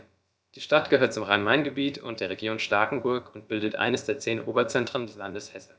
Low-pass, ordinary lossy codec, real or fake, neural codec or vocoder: none; none; fake; codec, 16 kHz, about 1 kbps, DyCAST, with the encoder's durations